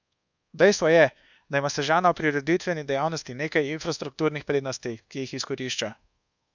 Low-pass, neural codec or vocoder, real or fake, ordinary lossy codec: 7.2 kHz; codec, 24 kHz, 1.2 kbps, DualCodec; fake; none